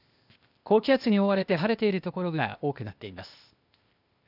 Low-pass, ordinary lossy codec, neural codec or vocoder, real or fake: 5.4 kHz; none; codec, 16 kHz, 0.8 kbps, ZipCodec; fake